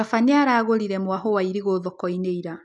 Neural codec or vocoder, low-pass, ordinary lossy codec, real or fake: none; 10.8 kHz; none; real